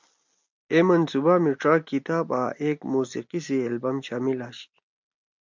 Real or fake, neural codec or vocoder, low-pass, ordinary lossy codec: real; none; 7.2 kHz; MP3, 64 kbps